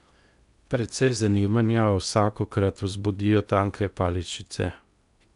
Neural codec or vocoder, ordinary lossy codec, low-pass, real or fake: codec, 16 kHz in and 24 kHz out, 0.8 kbps, FocalCodec, streaming, 65536 codes; none; 10.8 kHz; fake